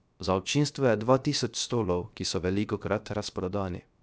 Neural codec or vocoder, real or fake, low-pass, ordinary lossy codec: codec, 16 kHz, 0.7 kbps, FocalCodec; fake; none; none